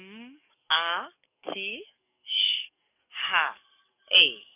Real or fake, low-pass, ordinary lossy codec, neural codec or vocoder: fake; 3.6 kHz; none; codec, 44.1 kHz, 7.8 kbps, DAC